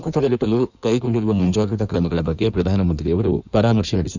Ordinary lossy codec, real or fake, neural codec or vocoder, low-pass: none; fake; codec, 16 kHz in and 24 kHz out, 1.1 kbps, FireRedTTS-2 codec; 7.2 kHz